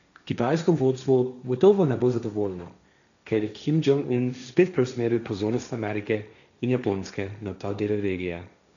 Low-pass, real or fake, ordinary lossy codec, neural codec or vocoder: 7.2 kHz; fake; none; codec, 16 kHz, 1.1 kbps, Voila-Tokenizer